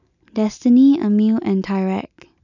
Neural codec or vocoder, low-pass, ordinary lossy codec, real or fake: none; 7.2 kHz; none; real